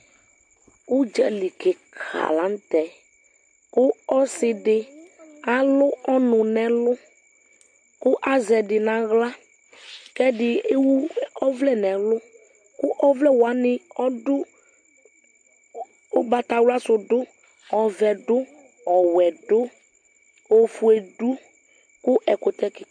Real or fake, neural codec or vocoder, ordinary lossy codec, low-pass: real; none; MP3, 48 kbps; 9.9 kHz